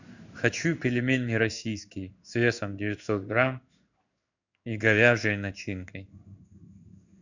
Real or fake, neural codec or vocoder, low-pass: fake; codec, 16 kHz in and 24 kHz out, 1 kbps, XY-Tokenizer; 7.2 kHz